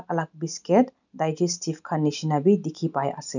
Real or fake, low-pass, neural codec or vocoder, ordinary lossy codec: real; 7.2 kHz; none; none